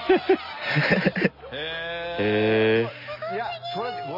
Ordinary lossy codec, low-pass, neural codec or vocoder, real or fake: none; 5.4 kHz; none; real